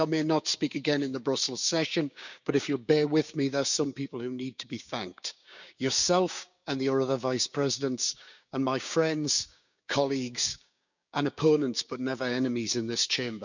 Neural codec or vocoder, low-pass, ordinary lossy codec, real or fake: codec, 16 kHz, 6 kbps, DAC; 7.2 kHz; none; fake